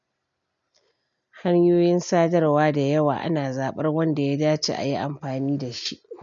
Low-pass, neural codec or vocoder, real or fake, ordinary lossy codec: 7.2 kHz; none; real; none